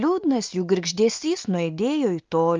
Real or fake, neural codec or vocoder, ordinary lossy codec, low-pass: real; none; Opus, 24 kbps; 7.2 kHz